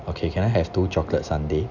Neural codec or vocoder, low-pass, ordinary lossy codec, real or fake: none; 7.2 kHz; none; real